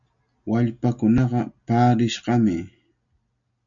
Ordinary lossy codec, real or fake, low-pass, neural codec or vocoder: MP3, 64 kbps; real; 7.2 kHz; none